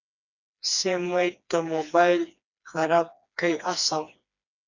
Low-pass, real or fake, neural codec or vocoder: 7.2 kHz; fake; codec, 16 kHz, 2 kbps, FreqCodec, smaller model